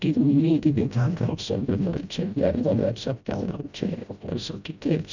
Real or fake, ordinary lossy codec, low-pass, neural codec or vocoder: fake; none; 7.2 kHz; codec, 16 kHz, 0.5 kbps, FreqCodec, smaller model